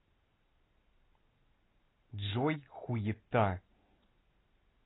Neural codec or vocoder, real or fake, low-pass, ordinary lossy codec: none; real; 7.2 kHz; AAC, 16 kbps